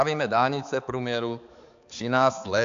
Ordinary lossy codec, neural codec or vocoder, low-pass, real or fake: AAC, 64 kbps; codec, 16 kHz, 4 kbps, X-Codec, HuBERT features, trained on balanced general audio; 7.2 kHz; fake